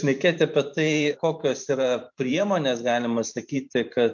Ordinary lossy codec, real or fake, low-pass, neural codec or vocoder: MP3, 64 kbps; fake; 7.2 kHz; vocoder, 44.1 kHz, 128 mel bands every 512 samples, BigVGAN v2